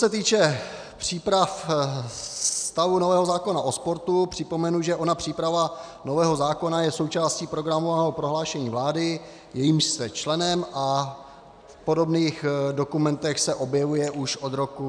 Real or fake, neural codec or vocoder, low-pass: real; none; 9.9 kHz